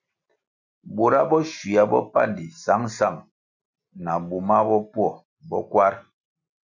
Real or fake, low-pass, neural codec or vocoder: real; 7.2 kHz; none